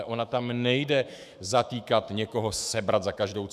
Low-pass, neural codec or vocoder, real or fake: 14.4 kHz; autoencoder, 48 kHz, 128 numbers a frame, DAC-VAE, trained on Japanese speech; fake